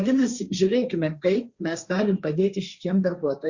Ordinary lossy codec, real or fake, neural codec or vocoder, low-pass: Opus, 64 kbps; fake; codec, 16 kHz, 1.1 kbps, Voila-Tokenizer; 7.2 kHz